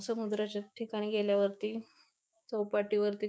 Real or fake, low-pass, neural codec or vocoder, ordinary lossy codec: fake; none; codec, 16 kHz, 6 kbps, DAC; none